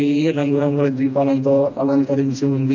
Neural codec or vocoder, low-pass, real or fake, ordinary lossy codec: codec, 16 kHz, 1 kbps, FreqCodec, smaller model; 7.2 kHz; fake; none